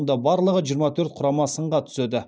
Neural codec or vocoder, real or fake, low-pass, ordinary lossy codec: none; real; none; none